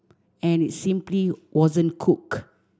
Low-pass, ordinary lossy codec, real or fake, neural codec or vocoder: none; none; real; none